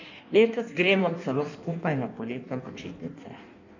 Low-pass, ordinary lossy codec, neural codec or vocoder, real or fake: 7.2 kHz; none; codec, 16 kHz in and 24 kHz out, 1.1 kbps, FireRedTTS-2 codec; fake